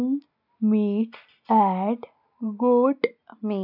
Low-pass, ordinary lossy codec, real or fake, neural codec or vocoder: 5.4 kHz; none; real; none